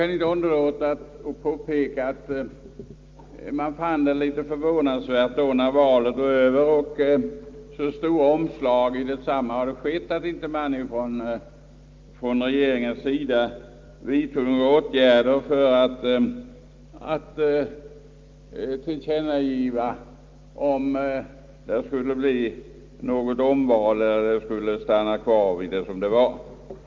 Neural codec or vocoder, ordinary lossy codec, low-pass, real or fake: none; Opus, 32 kbps; 7.2 kHz; real